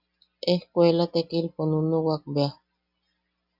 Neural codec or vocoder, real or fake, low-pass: none; real; 5.4 kHz